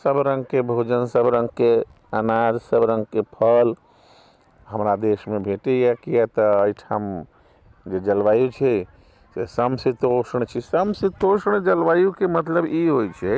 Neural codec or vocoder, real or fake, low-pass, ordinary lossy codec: none; real; none; none